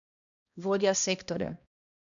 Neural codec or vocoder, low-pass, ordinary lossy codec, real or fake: codec, 16 kHz, 1 kbps, X-Codec, HuBERT features, trained on balanced general audio; 7.2 kHz; none; fake